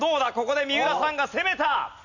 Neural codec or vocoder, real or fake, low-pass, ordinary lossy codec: none; real; 7.2 kHz; none